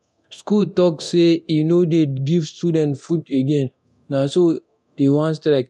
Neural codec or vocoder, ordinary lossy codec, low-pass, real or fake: codec, 24 kHz, 0.9 kbps, DualCodec; none; none; fake